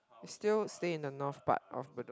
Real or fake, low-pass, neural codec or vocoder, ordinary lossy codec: real; none; none; none